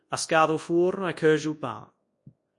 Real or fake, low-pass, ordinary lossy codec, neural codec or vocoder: fake; 10.8 kHz; MP3, 48 kbps; codec, 24 kHz, 0.9 kbps, WavTokenizer, large speech release